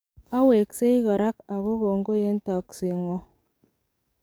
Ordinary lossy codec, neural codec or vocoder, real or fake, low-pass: none; codec, 44.1 kHz, 7.8 kbps, DAC; fake; none